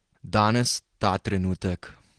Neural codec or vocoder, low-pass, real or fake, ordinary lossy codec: none; 9.9 kHz; real; Opus, 16 kbps